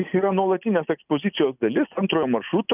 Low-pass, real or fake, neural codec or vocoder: 3.6 kHz; real; none